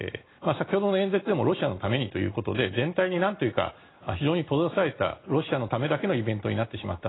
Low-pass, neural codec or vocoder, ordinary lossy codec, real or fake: 7.2 kHz; none; AAC, 16 kbps; real